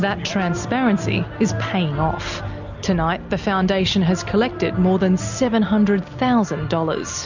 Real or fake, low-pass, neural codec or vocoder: real; 7.2 kHz; none